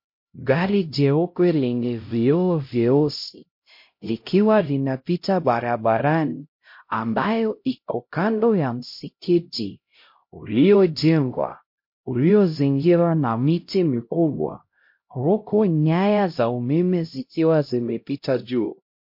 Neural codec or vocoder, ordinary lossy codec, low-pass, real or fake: codec, 16 kHz, 0.5 kbps, X-Codec, HuBERT features, trained on LibriSpeech; MP3, 32 kbps; 5.4 kHz; fake